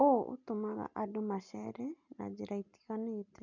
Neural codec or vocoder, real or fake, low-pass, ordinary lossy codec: none; real; 7.2 kHz; none